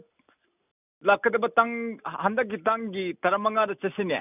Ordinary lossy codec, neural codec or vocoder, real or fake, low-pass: none; none; real; 3.6 kHz